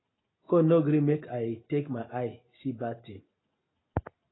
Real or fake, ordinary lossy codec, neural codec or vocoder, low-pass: real; AAC, 16 kbps; none; 7.2 kHz